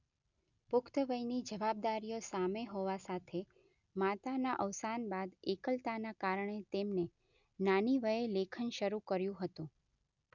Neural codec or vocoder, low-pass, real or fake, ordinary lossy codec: none; 7.2 kHz; real; none